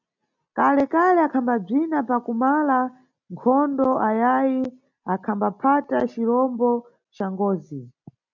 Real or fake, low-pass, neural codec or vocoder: real; 7.2 kHz; none